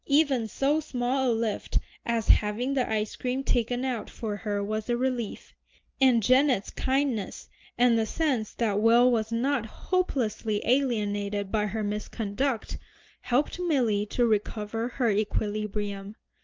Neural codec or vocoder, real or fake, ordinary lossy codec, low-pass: none; real; Opus, 32 kbps; 7.2 kHz